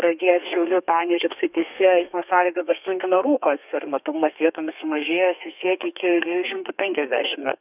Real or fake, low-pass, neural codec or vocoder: fake; 3.6 kHz; codec, 32 kHz, 1.9 kbps, SNAC